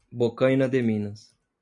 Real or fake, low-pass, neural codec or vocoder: real; 10.8 kHz; none